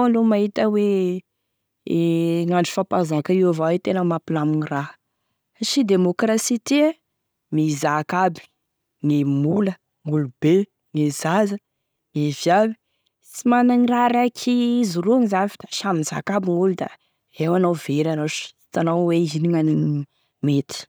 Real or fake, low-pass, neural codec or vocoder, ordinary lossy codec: real; none; none; none